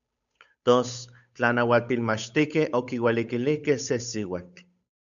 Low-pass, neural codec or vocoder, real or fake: 7.2 kHz; codec, 16 kHz, 8 kbps, FunCodec, trained on Chinese and English, 25 frames a second; fake